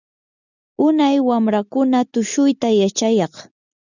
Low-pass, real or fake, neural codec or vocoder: 7.2 kHz; real; none